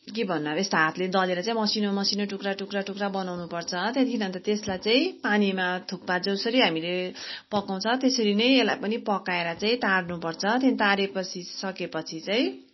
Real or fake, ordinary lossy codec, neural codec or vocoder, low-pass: real; MP3, 24 kbps; none; 7.2 kHz